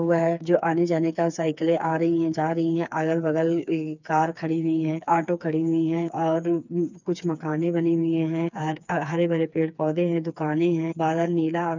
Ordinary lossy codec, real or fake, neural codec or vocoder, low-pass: none; fake; codec, 16 kHz, 4 kbps, FreqCodec, smaller model; 7.2 kHz